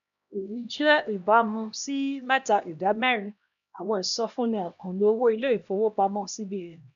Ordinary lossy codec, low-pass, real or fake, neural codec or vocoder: none; 7.2 kHz; fake; codec, 16 kHz, 1 kbps, X-Codec, HuBERT features, trained on LibriSpeech